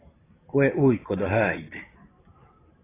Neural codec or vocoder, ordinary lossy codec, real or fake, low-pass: vocoder, 44.1 kHz, 128 mel bands every 512 samples, BigVGAN v2; AAC, 16 kbps; fake; 3.6 kHz